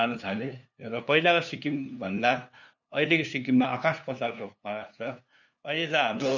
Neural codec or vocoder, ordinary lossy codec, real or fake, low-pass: codec, 16 kHz, 2 kbps, FunCodec, trained on LibriTTS, 25 frames a second; none; fake; 7.2 kHz